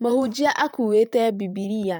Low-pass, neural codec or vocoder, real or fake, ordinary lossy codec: none; vocoder, 44.1 kHz, 128 mel bands, Pupu-Vocoder; fake; none